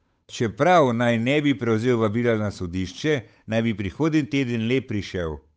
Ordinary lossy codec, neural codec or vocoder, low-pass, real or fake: none; codec, 16 kHz, 8 kbps, FunCodec, trained on Chinese and English, 25 frames a second; none; fake